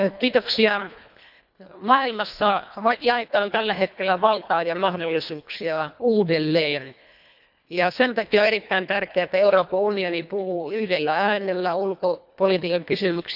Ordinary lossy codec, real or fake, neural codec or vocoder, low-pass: none; fake; codec, 24 kHz, 1.5 kbps, HILCodec; 5.4 kHz